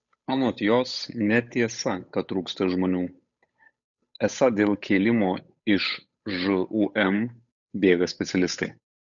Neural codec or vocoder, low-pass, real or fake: codec, 16 kHz, 8 kbps, FunCodec, trained on Chinese and English, 25 frames a second; 7.2 kHz; fake